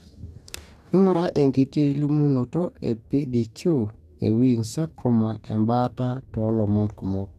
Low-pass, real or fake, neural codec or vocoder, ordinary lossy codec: 14.4 kHz; fake; codec, 44.1 kHz, 2.6 kbps, DAC; none